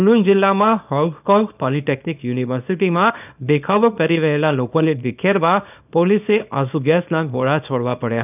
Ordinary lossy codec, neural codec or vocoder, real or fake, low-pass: none; codec, 24 kHz, 0.9 kbps, WavTokenizer, small release; fake; 3.6 kHz